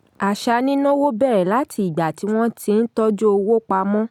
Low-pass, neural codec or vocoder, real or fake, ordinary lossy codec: 19.8 kHz; none; real; none